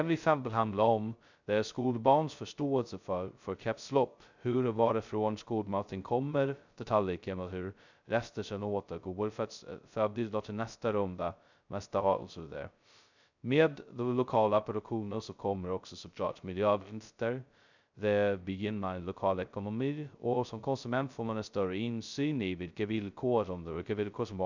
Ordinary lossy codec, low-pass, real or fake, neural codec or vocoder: none; 7.2 kHz; fake; codec, 16 kHz, 0.2 kbps, FocalCodec